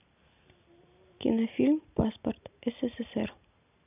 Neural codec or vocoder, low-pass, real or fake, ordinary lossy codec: none; 3.6 kHz; real; none